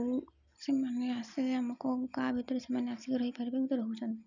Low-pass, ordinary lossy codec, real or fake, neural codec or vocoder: 7.2 kHz; none; real; none